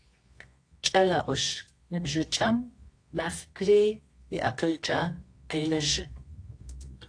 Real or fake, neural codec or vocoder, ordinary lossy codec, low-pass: fake; codec, 24 kHz, 0.9 kbps, WavTokenizer, medium music audio release; AAC, 48 kbps; 9.9 kHz